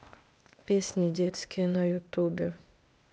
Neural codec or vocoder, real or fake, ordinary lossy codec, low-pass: codec, 16 kHz, 0.8 kbps, ZipCodec; fake; none; none